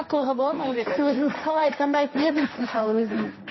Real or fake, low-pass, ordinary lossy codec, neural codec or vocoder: fake; 7.2 kHz; MP3, 24 kbps; codec, 16 kHz, 1.1 kbps, Voila-Tokenizer